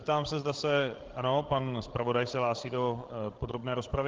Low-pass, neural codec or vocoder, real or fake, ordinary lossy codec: 7.2 kHz; codec, 16 kHz, 16 kbps, FreqCodec, larger model; fake; Opus, 16 kbps